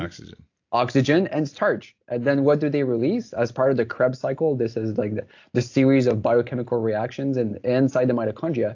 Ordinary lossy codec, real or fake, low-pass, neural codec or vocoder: AAC, 48 kbps; real; 7.2 kHz; none